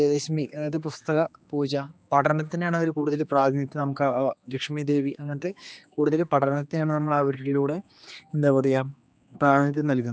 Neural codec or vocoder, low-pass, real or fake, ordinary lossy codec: codec, 16 kHz, 2 kbps, X-Codec, HuBERT features, trained on general audio; none; fake; none